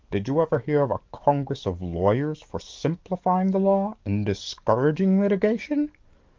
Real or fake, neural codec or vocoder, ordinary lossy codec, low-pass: fake; codec, 44.1 kHz, 7.8 kbps, DAC; Opus, 32 kbps; 7.2 kHz